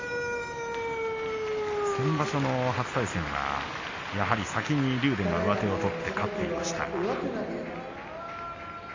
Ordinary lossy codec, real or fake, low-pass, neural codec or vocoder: AAC, 32 kbps; real; 7.2 kHz; none